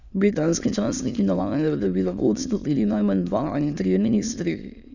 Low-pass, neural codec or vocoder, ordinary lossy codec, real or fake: 7.2 kHz; autoencoder, 22.05 kHz, a latent of 192 numbers a frame, VITS, trained on many speakers; none; fake